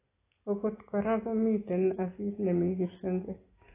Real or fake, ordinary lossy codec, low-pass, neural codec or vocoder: real; AAC, 16 kbps; 3.6 kHz; none